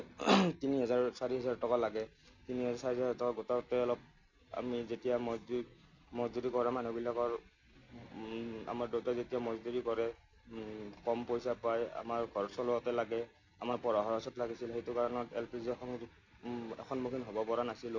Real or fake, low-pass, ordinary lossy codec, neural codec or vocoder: real; 7.2 kHz; none; none